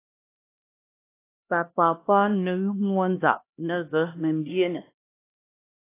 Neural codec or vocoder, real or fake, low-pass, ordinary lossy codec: codec, 16 kHz, 1 kbps, X-Codec, HuBERT features, trained on LibriSpeech; fake; 3.6 kHz; AAC, 24 kbps